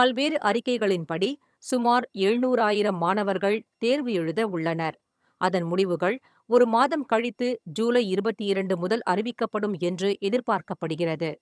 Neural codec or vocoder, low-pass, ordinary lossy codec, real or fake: vocoder, 22.05 kHz, 80 mel bands, HiFi-GAN; none; none; fake